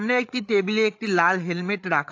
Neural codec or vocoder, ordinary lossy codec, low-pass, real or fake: codec, 16 kHz, 8 kbps, FreqCodec, larger model; AAC, 48 kbps; 7.2 kHz; fake